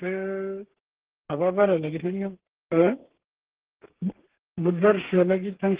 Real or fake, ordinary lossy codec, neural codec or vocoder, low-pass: fake; Opus, 16 kbps; codec, 32 kHz, 1.9 kbps, SNAC; 3.6 kHz